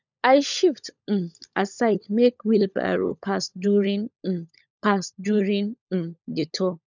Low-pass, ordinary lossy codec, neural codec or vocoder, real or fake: 7.2 kHz; none; codec, 16 kHz, 16 kbps, FunCodec, trained on LibriTTS, 50 frames a second; fake